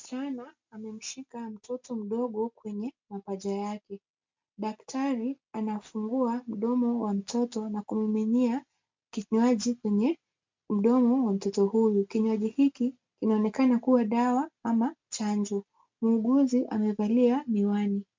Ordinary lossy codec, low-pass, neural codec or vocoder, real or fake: MP3, 64 kbps; 7.2 kHz; none; real